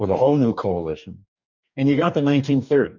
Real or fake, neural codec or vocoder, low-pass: fake; codec, 44.1 kHz, 2.6 kbps, DAC; 7.2 kHz